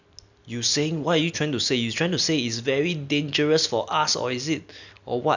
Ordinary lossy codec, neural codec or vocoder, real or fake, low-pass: none; none; real; 7.2 kHz